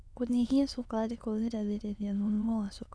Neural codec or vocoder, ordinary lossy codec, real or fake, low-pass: autoencoder, 22.05 kHz, a latent of 192 numbers a frame, VITS, trained on many speakers; none; fake; none